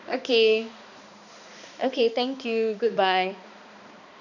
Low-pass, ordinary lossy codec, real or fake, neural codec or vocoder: 7.2 kHz; none; fake; codec, 16 kHz, 2 kbps, X-Codec, HuBERT features, trained on balanced general audio